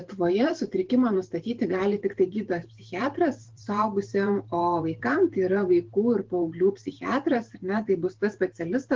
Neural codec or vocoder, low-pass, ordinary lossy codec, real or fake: vocoder, 44.1 kHz, 128 mel bands every 512 samples, BigVGAN v2; 7.2 kHz; Opus, 16 kbps; fake